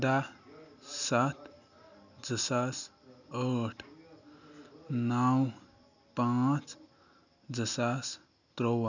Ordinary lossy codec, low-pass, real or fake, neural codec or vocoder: none; 7.2 kHz; real; none